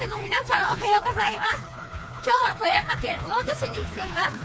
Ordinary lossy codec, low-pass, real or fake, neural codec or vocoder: none; none; fake; codec, 16 kHz, 2 kbps, FreqCodec, smaller model